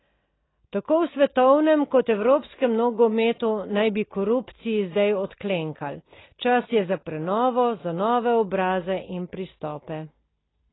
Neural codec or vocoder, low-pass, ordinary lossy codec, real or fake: none; 7.2 kHz; AAC, 16 kbps; real